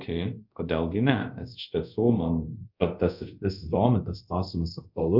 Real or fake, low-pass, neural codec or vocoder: fake; 5.4 kHz; codec, 24 kHz, 0.5 kbps, DualCodec